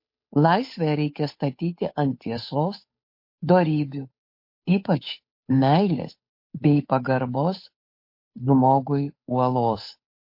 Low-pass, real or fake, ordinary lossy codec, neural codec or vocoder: 5.4 kHz; fake; MP3, 32 kbps; codec, 16 kHz, 8 kbps, FunCodec, trained on Chinese and English, 25 frames a second